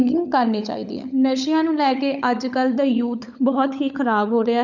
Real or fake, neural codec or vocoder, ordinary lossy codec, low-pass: fake; codec, 16 kHz, 16 kbps, FunCodec, trained on LibriTTS, 50 frames a second; none; 7.2 kHz